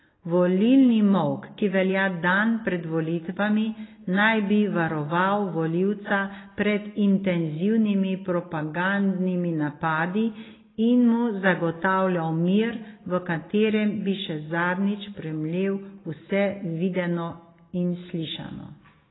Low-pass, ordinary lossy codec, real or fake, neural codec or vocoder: 7.2 kHz; AAC, 16 kbps; real; none